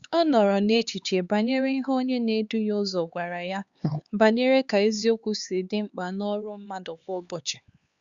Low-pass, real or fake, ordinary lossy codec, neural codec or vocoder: 7.2 kHz; fake; Opus, 64 kbps; codec, 16 kHz, 4 kbps, X-Codec, HuBERT features, trained on LibriSpeech